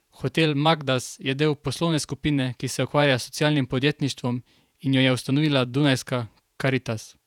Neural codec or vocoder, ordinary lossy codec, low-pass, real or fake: vocoder, 48 kHz, 128 mel bands, Vocos; none; 19.8 kHz; fake